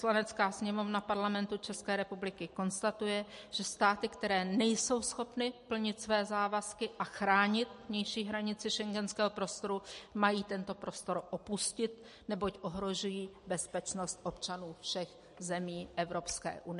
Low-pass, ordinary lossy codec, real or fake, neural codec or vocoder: 10.8 kHz; MP3, 48 kbps; real; none